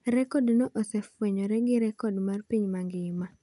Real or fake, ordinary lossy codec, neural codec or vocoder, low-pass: real; none; none; 10.8 kHz